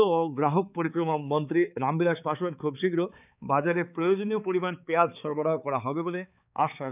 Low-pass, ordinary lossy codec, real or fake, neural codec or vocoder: 3.6 kHz; none; fake; codec, 16 kHz, 4 kbps, X-Codec, HuBERT features, trained on balanced general audio